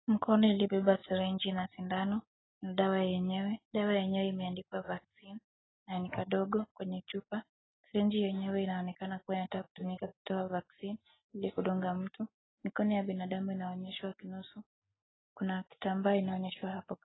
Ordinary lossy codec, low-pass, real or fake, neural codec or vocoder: AAC, 16 kbps; 7.2 kHz; real; none